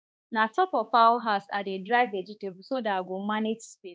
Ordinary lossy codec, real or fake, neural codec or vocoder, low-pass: none; fake; codec, 16 kHz, 2 kbps, X-Codec, HuBERT features, trained on balanced general audio; none